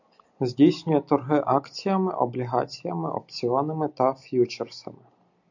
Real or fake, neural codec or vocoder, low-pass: real; none; 7.2 kHz